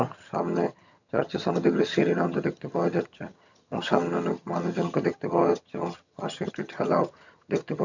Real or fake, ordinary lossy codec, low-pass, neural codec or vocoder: fake; none; 7.2 kHz; vocoder, 22.05 kHz, 80 mel bands, HiFi-GAN